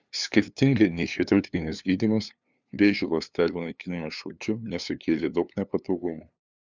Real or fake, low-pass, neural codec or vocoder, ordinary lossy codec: fake; 7.2 kHz; codec, 16 kHz, 2 kbps, FunCodec, trained on LibriTTS, 25 frames a second; Opus, 64 kbps